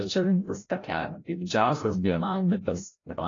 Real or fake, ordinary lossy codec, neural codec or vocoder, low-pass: fake; AAC, 32 kbps; codec, 16 kHz, 0.5 kbps, FreqCodec, larger model; 7.2 kHz